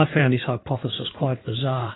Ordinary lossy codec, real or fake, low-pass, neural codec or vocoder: AAC, 16 kbps; fake; 7.2 kHz; codec, 16 kHz in and 24 kHz out, 2.2 kbps, FireRedTTS-2 codec